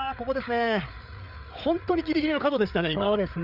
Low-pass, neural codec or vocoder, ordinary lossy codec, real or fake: 5.4 kHz; codec, 16 kHz, 8 kbps, FreqCodec, larger model; none; fake